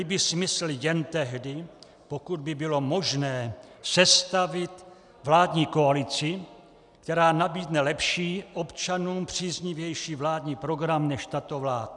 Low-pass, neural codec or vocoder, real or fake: 10.8 kHz; none; real